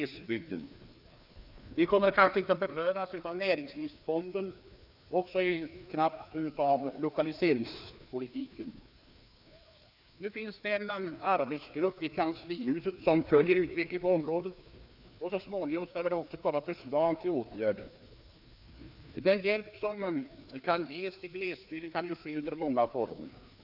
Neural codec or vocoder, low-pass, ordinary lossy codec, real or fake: codec, 16 kHz, 2 kbps, FreqCodec, larger model; 5.4 kHz; none; fake